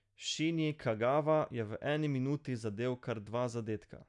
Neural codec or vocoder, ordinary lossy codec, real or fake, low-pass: none; none; real; 10.8 kHz